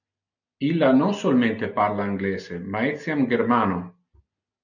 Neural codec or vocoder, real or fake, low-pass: none; real; 7.2 kHz